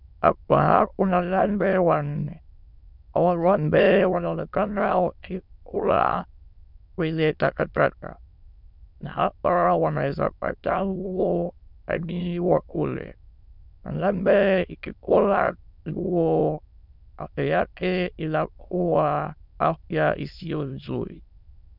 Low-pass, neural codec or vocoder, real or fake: 5.4 kHz; autoencoder, 22.05 kHz, a latent of 192 numbers a frame, VITS, trained on many speakers; fake